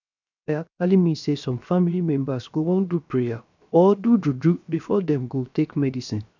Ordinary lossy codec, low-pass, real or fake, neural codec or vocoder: none; 7.2 kHz; fake; codec, 16 kHz, 0.7 kbps, FocalCodec